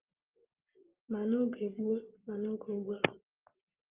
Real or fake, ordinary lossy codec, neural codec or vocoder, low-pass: real; Opus, 32 kbps; none; 3.6 kHz